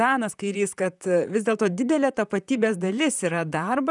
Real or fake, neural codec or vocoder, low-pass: fake; vocoder, 44.1 kHz, 128 mel bands, Pupu-Vocoder; 10.8 kHz